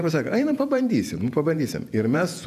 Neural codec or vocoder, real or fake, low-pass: vocoder, 48 kHz, 128 mel bands, Vocos; fake; 14.4 kHz